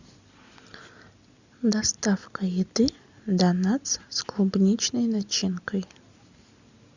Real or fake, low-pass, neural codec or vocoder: real; 7.2 kHz; none